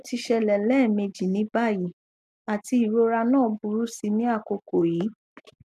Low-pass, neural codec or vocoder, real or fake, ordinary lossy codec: 14.4 kHz; none; real; none